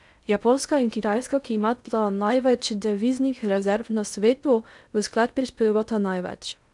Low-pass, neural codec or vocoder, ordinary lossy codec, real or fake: 10.8 kHz; codec, 16 kHz in and 24 kHz out, 0.6 kbps, FocalCodec, streaming, 2048 codes; none; fake